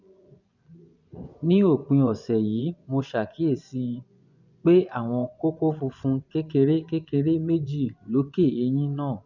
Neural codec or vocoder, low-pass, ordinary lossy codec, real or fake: vocoder, 24 kHz, 100 mel bands, Vocos; 7.2 kHz; none; fake